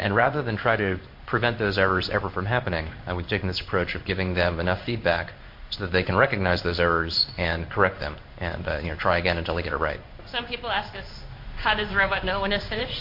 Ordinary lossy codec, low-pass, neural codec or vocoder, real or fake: MP3, 32 kbps; 5.4 kHz; codec, 16 kHz in and 24 kHz out, 1 kbps, XY-Tokenizer; fake